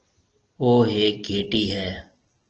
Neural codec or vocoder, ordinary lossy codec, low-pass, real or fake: none; Opus, 16 kbps; 7.2 kHz; real